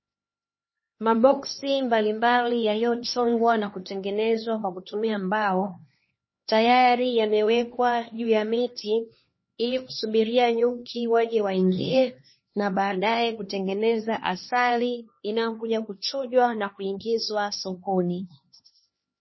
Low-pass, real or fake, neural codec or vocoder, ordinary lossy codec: 7.2 kHz; fake; codec, 16 kHz, 2 kbps, X-Codec, HuBERT features, trained on LibriSpeech; MP3, 24 kbps